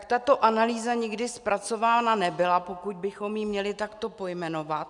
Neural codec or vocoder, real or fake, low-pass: none; real; 10.8 kHz